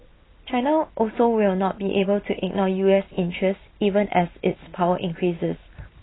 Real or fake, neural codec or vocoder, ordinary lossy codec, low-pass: real; none; AAC, 16 kbps; 7.2 kHz